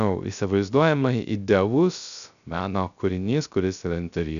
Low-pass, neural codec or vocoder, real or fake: 7.2 kHz; codec, 16 kHz, 0.3 kbps, FocalCodec; fake